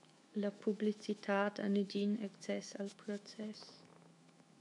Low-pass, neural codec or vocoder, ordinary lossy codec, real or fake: 10.8 kHz; autoencoder, 48 kHz, 128 numbers a frame, DAC-VAE, trained on Japanese speech; MP3, 96 kbps; fake